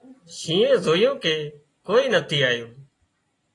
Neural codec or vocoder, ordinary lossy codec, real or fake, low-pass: none; AAC, 32 kbps; real; 10.8 kHz